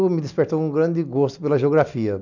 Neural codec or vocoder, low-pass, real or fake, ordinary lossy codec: none; 7.2 kHz; real; none